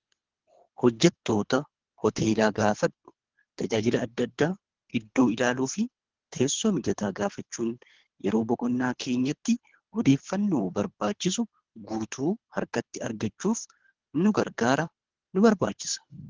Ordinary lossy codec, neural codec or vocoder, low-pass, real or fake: Opus, 16 kbps; codec, 24 kHz, 3 kbps, HILCodec; 7.2 kHz; fake